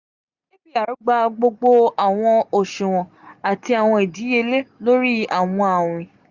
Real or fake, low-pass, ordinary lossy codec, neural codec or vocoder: real; 7.2 kHz; Opus, 64 kbps; none